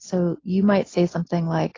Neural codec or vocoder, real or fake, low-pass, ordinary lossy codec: none; real; 7.2 kHz; AAC, 32 kbps